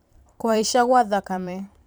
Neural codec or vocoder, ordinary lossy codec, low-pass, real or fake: vocoder, 44.1 kHz, 128 mel bands every 512 samples, BigVGAN v2; none; none; fake